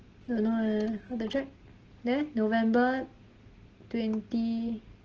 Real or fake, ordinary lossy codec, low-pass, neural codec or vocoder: real; Opus, 16 kbps; 7.2 kHz; none